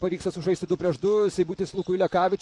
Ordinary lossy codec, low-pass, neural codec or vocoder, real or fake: MP3, 64 kbps; 10.8 kHz; vocoder, 44.1 kHz, 128 mel bands, Pupu-Vocoder; fake